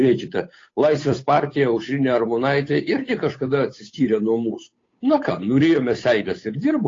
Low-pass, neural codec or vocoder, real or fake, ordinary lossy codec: 7.2 kHz; codec, 16 kHz, 8 kbps, FunCodec, trained on Chinese and English, 25 frames a second; fake; AAC, 32 kbps